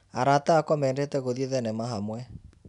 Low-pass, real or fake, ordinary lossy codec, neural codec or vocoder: 10.8 kHz; real; none; none